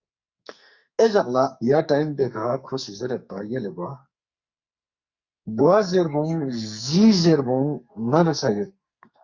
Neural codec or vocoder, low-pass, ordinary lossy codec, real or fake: codec, 44.1 kHz, 2.6 kbps, SNAC; 7.2 kHz; Opus, 64 kbps; fake